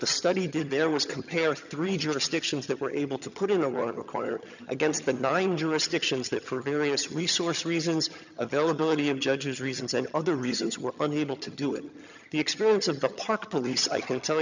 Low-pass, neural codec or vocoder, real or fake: 7.2 kHz; vocoder, 22.05 kHz, 80 mel bands, HiFi-GAN; fake